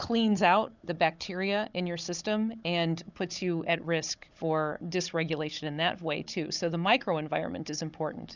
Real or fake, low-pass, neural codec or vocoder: fake; 7.2 kHz; codec, 16 kHz, 16 kbps, FunCodec, trained on Chinese and English, 50 frames a second